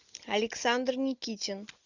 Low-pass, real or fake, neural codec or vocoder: 7.2 kHz; real; none